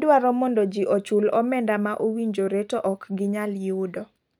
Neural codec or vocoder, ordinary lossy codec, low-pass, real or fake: none; none; 19.8 kHz; real